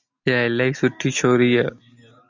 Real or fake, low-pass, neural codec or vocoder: real; 7.2 kHz; none